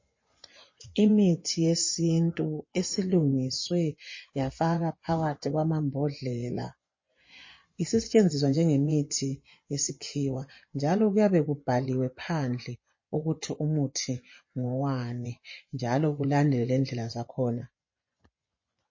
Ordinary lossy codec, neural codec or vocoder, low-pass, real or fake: MP3, 32 kbps; vocoder, 24 kHz, 100 mel bands, Vocos; 7.2 kHz; fake